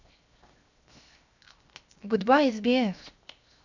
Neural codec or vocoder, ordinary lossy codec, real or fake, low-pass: codec, 16 kHz, 0.7 kbps, FocalCodec; none; fake; 7.2 kHz